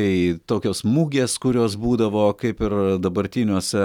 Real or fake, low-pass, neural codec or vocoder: real; 19.8 kHz; none